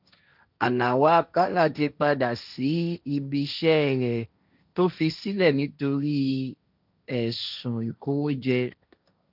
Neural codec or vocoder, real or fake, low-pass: codec, 16 kHz, 1.1 kbps, Voila-Tokenizer; fake; 5.4 kHz